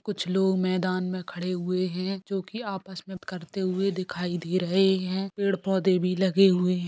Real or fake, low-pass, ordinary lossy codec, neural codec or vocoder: real; none; none; none